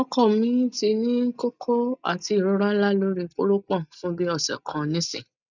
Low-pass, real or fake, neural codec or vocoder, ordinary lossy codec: 7.2 kHz; real; none; none